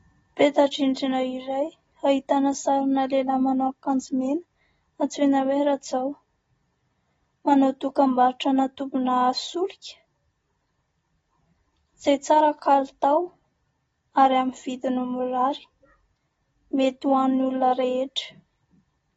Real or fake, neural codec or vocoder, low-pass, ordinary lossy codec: real; none; 19.8 kHz; AAC, 24 kbps